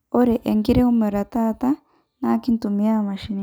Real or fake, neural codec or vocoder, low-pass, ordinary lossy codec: real; none; none; none